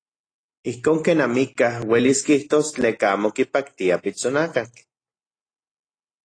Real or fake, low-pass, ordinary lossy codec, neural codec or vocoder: real; 9.9 kHz; AAC, 32 kbps; none